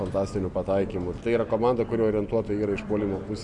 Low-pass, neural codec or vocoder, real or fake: 10.8 kHz; none; real